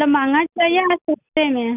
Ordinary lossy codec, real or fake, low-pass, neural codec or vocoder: none; real; 3.6 kHz; none